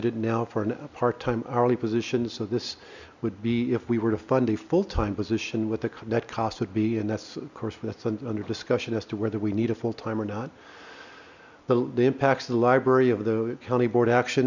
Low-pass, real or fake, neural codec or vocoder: 7.2 kHz; real; none